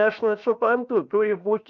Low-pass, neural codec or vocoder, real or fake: 7.2 kHz; codec, 16 kHz, 0.7 kbps, FocalCodec; fake